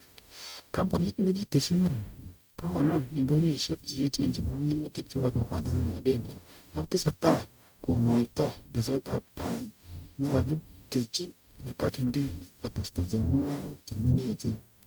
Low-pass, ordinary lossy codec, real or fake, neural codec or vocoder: none; none; fake; codec, 44.1 kHz, 0.9 kbps, DAC